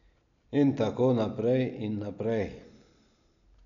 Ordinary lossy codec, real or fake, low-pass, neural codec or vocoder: none; real; 7.2 kHz; none